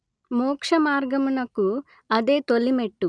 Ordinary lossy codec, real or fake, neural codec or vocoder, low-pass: none; real; none; 9.9 kHz